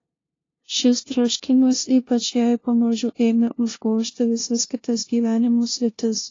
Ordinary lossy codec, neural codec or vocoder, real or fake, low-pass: AAC, 32 kbps; codec, 16 kHz, 0.5 kbps, FunCodec, trained on LibriTTS, 25 frames a second; fake; 7.2 kHz